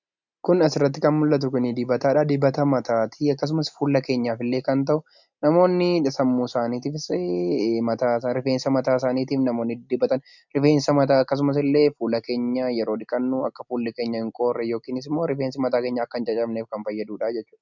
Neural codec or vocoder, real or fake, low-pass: none; real; 7.2 kHz